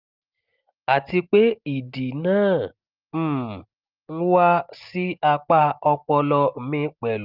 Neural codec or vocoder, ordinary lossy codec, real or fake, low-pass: none; Opus, 32 kbps; real; 5.4 kHz